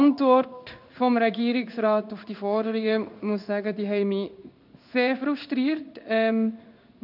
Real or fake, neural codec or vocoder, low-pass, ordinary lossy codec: fake; codec, 16 kHz in and 24 kHz out, 1 kbps, XY-Tokenizer; 5.4 kHz; none